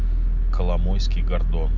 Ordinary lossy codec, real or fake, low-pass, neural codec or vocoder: none; real; 7.2 kHz; none